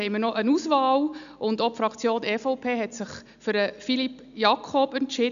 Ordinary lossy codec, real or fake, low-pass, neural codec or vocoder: none; real; 7.2 kHz; none